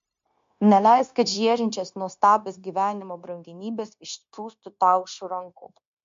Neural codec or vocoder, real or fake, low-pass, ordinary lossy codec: codec, 16 kHz, 0.9 kbps, LongCat-Audio-Codec; fake; 7.2 kHz; MP3, 48 kbps